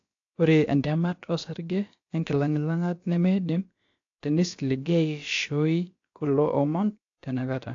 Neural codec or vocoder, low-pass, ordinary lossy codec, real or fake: codec, 16 kHz, about 1 kbps, DyCAST, with the encoder's durations; 7.2 kHz; AAC, 48 kbps; fake